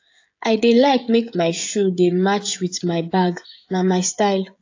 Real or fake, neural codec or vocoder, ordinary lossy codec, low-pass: fake; codec, 16 kHz, 16 kbps, FreqCodec, smaller model; AAC, 48 kbps; 7.2 kHz